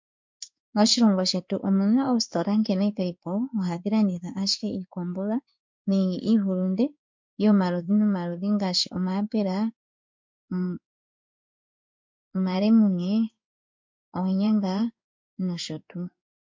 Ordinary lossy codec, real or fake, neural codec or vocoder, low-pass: MP3, 48 kbps; fake; codec, 16 kHz in and 24 kHz out, 1 kbps, XY-Tokenizer; 7.2 kHz